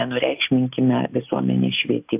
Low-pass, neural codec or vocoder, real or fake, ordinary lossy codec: 3.6 kHz; none; real; AAC, 32 kbps